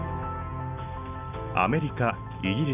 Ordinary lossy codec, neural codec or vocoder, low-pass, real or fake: none; none; 3.6 kHz; real